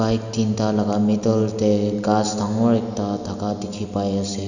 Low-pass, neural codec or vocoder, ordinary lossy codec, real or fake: 7.2 kHz; none; none; real